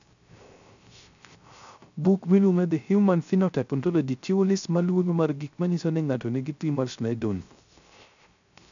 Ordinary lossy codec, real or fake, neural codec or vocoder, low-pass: none; fake; codec, 16 kHz, 0.3 kbps, FocalCodec; 7.2 kHz